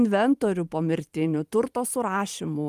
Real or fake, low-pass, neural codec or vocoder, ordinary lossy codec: fake; 14.4 kHz; vocoder, 44.1 kHz, 128 mel bands every 512 samples, BigVGAN v2; Opus, 32 kbps